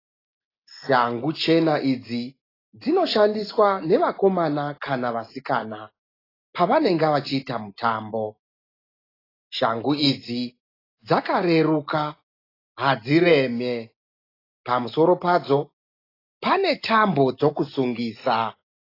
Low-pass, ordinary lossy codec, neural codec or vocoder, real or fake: 5.4 kHz; AAC, 24 kbps; none; real